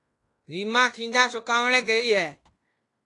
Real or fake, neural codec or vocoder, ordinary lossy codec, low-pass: fake; codec, 16 kHz in and 24 kHz out, 0.9 kbps, LongCat-Audio-Codec, fine tuned four codebook decoder; AAC, 48 kbps; 10.8 kHz